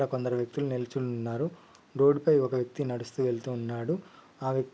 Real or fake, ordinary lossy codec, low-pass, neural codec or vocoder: real; none; none; none